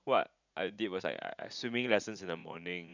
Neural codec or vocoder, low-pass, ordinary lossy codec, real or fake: vocoder, 44.1 kHz, 80 mel bands, Vocos; 7.2 kHz; none; fake